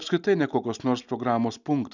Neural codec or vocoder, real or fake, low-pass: none; real; 7.2 kHz